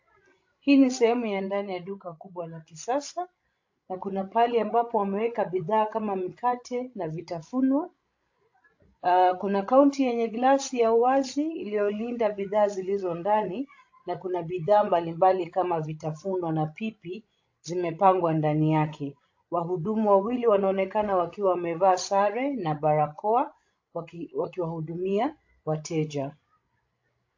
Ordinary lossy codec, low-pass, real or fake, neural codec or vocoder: AAC, 48 kbps; 7.2 kHz; fake; codec, 16 kHz, 16 kbps, FreqCodec, larger model